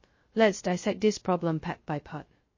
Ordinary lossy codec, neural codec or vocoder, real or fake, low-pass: MP3, 32 kbps; codec, 16 kHz, 0.2 kbps, FocalCodec; fake; 7.2 kHz